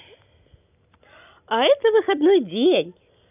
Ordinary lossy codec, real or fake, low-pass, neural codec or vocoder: none; real; 3.6 kHz; none